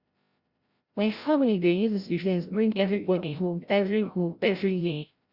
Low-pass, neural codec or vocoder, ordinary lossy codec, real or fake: 5.4 kHz; codec, 16 kHz, 0.5 kbps, FreqCodec, larger model; Opus, 64 kbps; fake